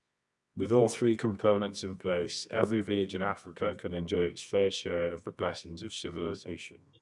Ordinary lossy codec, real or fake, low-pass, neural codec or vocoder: none; fake; 10.8 kHz; codec, 24 kHz, 0.9 kbps, WavTokenizer, medium music audio release